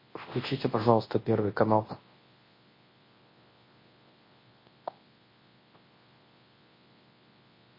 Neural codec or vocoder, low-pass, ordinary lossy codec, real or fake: codec, 24 kHz, 0.9 kbps, WavTokenizer, large speech release; 5.4 kHz; MP3, 24 kbps; fake